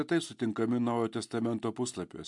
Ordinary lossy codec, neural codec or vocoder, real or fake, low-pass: MP3, 64 kbps; none; real; 10.8 kHz